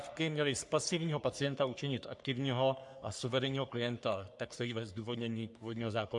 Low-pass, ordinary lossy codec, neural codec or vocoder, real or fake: 10.8 kHz; MP3, 64 kbps; codec, 44.1 kHz, 3.4 kbps, Pupu-Codec; fake